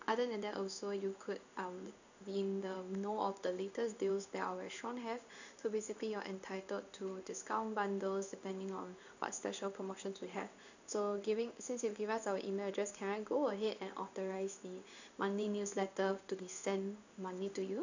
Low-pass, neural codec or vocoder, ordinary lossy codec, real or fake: 7.2 kHz; codec, 16 kHz in and 24 kHz out, 1 kbps, XY-Tokenizer; none; fake